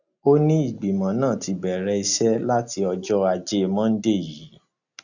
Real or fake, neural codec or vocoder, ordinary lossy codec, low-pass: real; none; none; 7.2 kHz